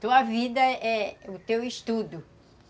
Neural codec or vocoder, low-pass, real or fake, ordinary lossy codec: none; none; real; none